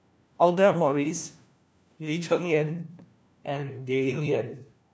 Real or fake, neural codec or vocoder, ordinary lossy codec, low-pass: fake; codec, 16 kHz, 1 kbps, FunCodec, trained on LibriTTS, 50 frames a second; none; none